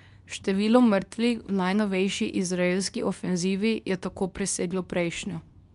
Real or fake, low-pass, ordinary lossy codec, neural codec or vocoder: fake; 10.8 kHz; MP3, 96 kbps; codec, 24 kHz, 0.9 kbps, WavTokenizer, medium speech release version 2